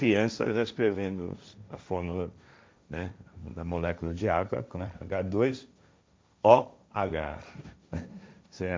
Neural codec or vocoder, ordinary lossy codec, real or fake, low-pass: codec, 16 kHz, 1.1 kbps, Voila-Tokenizer; none; fake; none